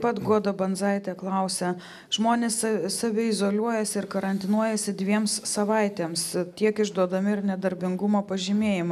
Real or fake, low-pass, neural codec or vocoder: real; 14.4 kHz; none